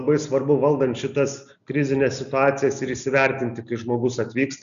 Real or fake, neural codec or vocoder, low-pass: real; none; 7.2 kHz